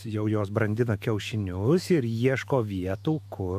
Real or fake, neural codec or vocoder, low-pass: fake; autoencoder, 48 kHz, 128 numbers a frame, DAC-VAE, trained on Japanese speech; 14.4 kHz